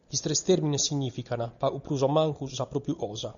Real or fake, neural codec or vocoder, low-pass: real; none; 7.2 kHz